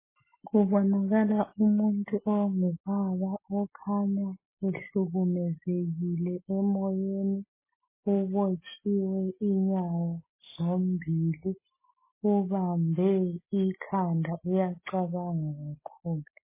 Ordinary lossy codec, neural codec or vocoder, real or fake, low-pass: MP3, 16 kbps; none; real; 3.6 kHz